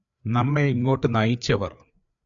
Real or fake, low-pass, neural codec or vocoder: fake; 7.2 kHz; codec, 16 kHz, 4 kbps, FreqCodec, larger model